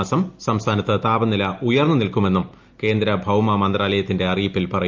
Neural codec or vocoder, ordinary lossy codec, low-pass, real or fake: none; Opus, 24 kbps; 7.2 kHz; real